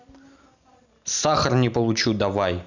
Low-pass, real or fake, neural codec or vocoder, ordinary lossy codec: 7.2 kHz; real; none; none